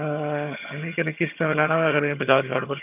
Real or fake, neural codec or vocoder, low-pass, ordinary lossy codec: fake; vocoder, 22.05 kHz, 80 mel bands, HiFi-GAN; 3.6 kHz; none